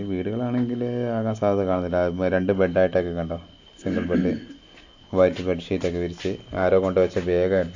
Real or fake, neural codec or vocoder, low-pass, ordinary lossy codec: real; none; 7.2 kHz; AAC, 48 kbps